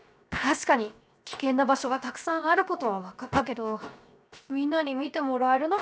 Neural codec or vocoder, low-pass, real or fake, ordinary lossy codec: codec, 16 kHz, 0.7 kbps, FocalCodec; none; fake; none